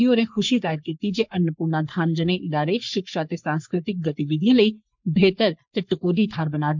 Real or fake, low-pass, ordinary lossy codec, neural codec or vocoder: fake; 7.2 kHz; MP3, 64 kbps; codec, 44.1 kHz, 3.4 kbps, Pupu-Codec